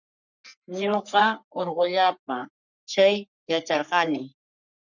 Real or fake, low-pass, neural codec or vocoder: fake; 7.2 kHz; codec, 44.1 kHz, 3.4 kbps, Pupu-Codec